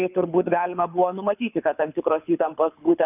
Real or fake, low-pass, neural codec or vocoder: fake; 3.6 kHz; codec, 24 kHz, 6 kbps, HILCodec